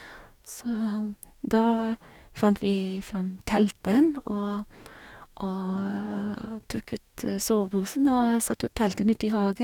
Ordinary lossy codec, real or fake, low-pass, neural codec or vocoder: none; fake; 19.8 kHz; codec, 44.1 kHz, 2.6 kbps, DAC